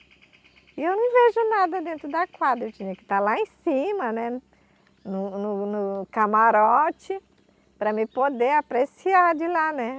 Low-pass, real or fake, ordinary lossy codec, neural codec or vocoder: none; real; none; none